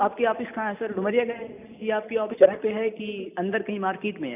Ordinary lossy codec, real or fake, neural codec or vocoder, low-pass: none; real; none; 3.6 kHz